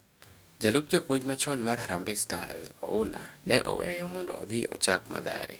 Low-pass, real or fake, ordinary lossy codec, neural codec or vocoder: none; fake; none; codec, 44.1 kHz, 2.6 kbps, DAC